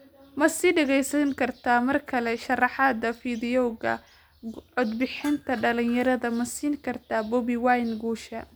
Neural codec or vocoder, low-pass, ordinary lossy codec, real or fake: none; none; none; real